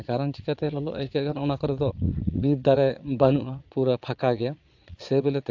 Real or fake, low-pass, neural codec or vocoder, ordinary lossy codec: fake; 7.2 kHz; vocoder, 22.05 kHz, 80 mel bands, Vocos; AAC, 48 kbps